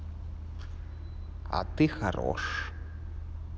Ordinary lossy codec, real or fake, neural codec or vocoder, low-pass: none; real; none; none